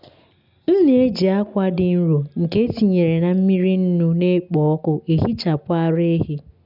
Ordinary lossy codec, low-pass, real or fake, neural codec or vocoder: none; 5.4 kHz; real; none